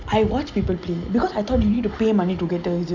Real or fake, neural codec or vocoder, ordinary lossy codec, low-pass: real; none; none; 7.2 kHz